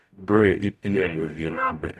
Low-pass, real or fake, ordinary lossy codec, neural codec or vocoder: 14.4 kHz; fake; none; codec, 44.1 kHz, 0.9 kbps, DAC